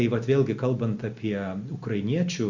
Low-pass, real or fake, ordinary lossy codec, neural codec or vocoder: 7.2 kHz; real; Opus, 64 kbps; none